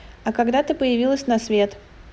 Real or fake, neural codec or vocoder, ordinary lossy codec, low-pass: real; none; none; none